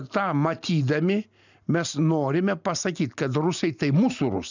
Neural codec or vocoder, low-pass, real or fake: none; 7.2 kHz; real